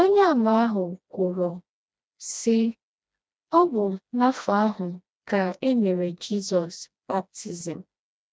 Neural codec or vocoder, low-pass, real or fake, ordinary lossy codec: codec, 16 kHz, 1 kbps, FreqCodec, smaller model; none; fake; none